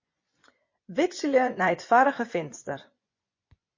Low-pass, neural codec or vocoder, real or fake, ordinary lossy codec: 7.2 kHz; vocoder, 24 kHz, 100 mel bands, Vocos; fake; MP3, 32 kbps